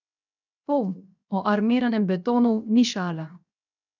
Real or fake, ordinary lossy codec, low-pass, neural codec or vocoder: fake; none; 7.2 kHz; codec, 16 kHz, 0.7 kbps, FocalCodec